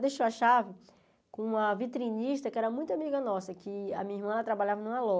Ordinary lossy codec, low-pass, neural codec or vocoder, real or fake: none; none; none; real